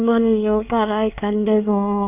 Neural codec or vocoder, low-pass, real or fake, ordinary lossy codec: codec, 24 kHz, 1 kbps, SNAC; 3.6 kHz; fake; none